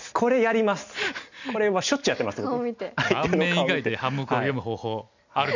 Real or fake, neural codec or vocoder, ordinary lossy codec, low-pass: real; none; none; 7.2 kHz